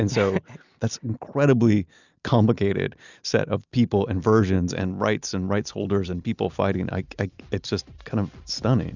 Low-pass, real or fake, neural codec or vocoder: 7.2 kHz; real; none